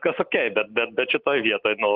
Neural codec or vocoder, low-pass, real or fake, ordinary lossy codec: none; 5.4 kHz; real; Opus, 32 kbps